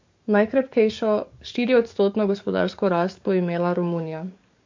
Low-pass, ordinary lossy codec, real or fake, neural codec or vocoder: 7.2 kHz; MP3, 48 kbps; fake; codec, 44.1 kHz, 7.8 kbps, DAC